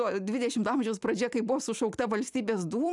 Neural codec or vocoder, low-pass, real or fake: none; 10.8 kHz; real